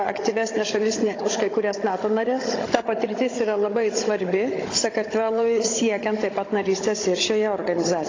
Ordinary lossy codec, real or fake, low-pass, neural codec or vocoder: AAC, 32 kbps; fake; 7.2 kHz; codec, 16 kHz, 16 kbps, FunCodec, trained on Chinese and English, 50 frames a second